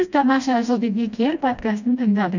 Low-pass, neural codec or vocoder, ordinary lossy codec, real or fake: 7.2 kHz; codec, 16 kHz, 1 kbps, FreqCodec, smaller model; none; fake